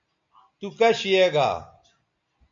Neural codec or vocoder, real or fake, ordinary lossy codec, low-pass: none; real; MP3, 64 kbps; 7.2 kHz